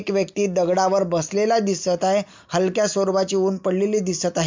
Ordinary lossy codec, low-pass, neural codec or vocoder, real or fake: MP3, 64 kbps; 7.2 kHz; none; real